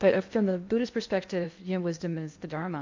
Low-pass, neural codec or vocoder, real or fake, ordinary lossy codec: 7.2 kHz; codec, 16 kHz in and 24 kHz out, 0.8 kbps, FocalCodec, streaming, 65536 codes; fake; MP3, 48 kbps